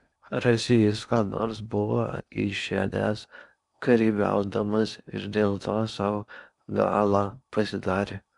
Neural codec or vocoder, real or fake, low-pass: codec, 16 kHz in and 24 kHz out, 0.8 kbps, FocalCodec, streaming, 65536 codes; fake; 10.8 kHz